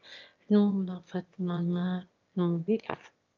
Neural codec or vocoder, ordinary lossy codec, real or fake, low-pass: autoencoder, 22.05 kHz, a latent of 192 numbers a frame, VITS, trained on one speaker; Opus, 24 kbps; fake; 7.2 kHz